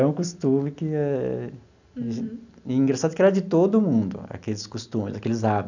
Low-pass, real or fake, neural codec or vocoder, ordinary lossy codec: 7.2 kHz; real; none; none